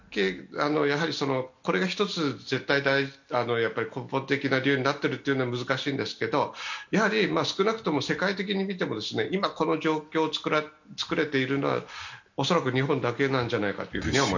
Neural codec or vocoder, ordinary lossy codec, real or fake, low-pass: none; none; real; 7.2 kHz